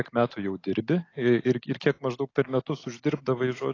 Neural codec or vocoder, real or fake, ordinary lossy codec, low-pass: none; real; AAC, 32 kbps; 7.2 kHz